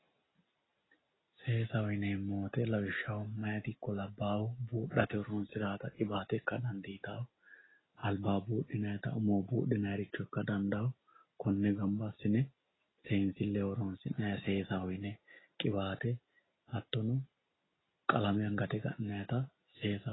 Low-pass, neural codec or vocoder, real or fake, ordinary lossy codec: 7.2 kHz; none; real; AAC, 16 kbps